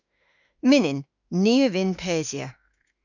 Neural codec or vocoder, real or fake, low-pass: autoencoder, 48 kHz, 32 numbers a frame, DAC-VAE, trained on Japanese speech; fake; 7.2 kHz